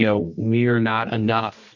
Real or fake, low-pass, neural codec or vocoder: fake; 7.2 kHz; codec, 24 kHz, 0.9 kbps, WavTokenizer, medium music audio release